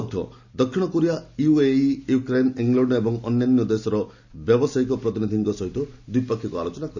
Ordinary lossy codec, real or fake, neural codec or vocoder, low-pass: none; real; none; 7.2 kHz